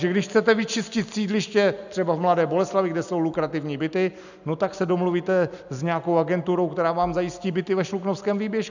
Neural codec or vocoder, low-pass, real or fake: none; 7.2 kHz; real